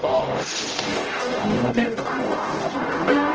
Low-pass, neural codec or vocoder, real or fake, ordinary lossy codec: 7.2 kHz; codec, 44.1 kHz, 0.9 kbps, DAC; fake; Opus, 16 kbps